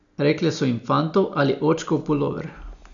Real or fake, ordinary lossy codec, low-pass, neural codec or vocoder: real; none; 7.2 kHz; none